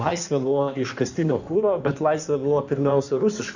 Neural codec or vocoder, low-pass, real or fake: codec, 16 kHz in and 24 kHz out, 1.1 kbps, FireRedTTS-2 codec; 7.2 kHz; fake